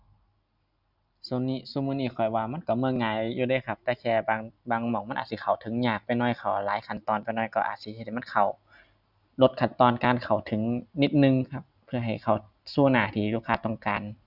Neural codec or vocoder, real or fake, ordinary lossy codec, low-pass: none; real; none; 5.4 kHz